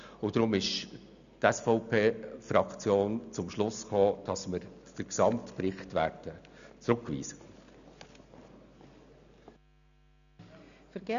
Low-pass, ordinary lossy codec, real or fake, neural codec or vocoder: 7.2 kHz; none; real; none